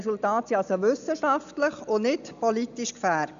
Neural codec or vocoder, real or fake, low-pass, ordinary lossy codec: none; real; 7.2 kHz; none